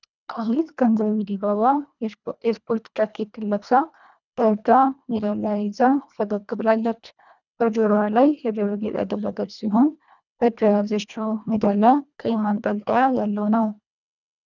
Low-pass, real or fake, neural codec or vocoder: 7.2 kHz; fake; codec, 24 kHz, 1.5 kbps, HILCodec